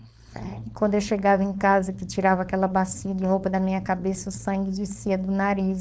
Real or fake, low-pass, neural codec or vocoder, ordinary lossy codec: fake; none; codec, 16 kHz, 4.8 kbps, FACodec; none